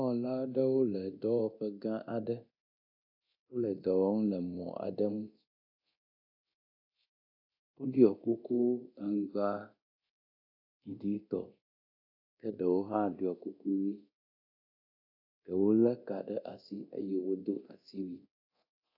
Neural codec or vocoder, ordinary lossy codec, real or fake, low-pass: codec, 24 kHz, 0.9 kbps, DualCodec; AAC, 32 kbps; fake; 5.4 kHz